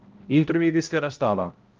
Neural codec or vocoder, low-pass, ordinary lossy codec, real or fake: codec, 16 kHz, 0.5 kbps, X-Codec, HuBERT features, trained on balanced general audio; 7.2 kHz; Opus, 32 kbps; fake